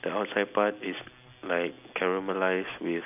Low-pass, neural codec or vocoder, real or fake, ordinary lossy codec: 3.6 kHz; none; real; none